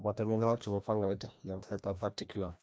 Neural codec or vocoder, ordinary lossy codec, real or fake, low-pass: codec, 16 kHz, 1 kbps, FreqCodec, larger model; none; fake; none